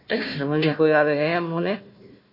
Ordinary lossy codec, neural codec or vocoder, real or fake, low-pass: MP3, 32 kbps; codec, 16 kHz, 1 kbps, FunCodec, trained on Chinese and English, 50 frames a second; fake; 5.4 kHz